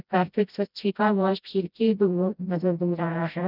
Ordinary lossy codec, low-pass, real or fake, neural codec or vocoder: none; 5.4 kHz; fake; codec, 16 kHz, 0.5 kbps, FreqCodec, smaller model